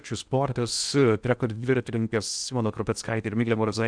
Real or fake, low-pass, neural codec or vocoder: fake; 9.9 kHz; codec, 16 kHz in and 24 kHz out, 0.8 kbps, FocalCodec, streaming, 65536 codes